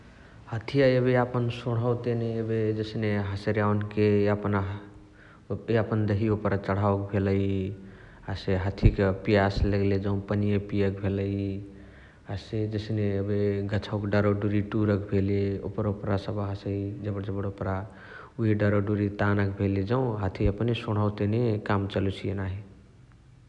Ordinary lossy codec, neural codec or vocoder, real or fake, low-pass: none; none; real; 10.8 kHz